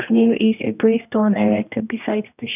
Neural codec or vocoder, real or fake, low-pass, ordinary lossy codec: codec, 16 kHz, 1 kbps, X-Codec, HuBERT features, trained on general audio; fake; 3.6 kHz; none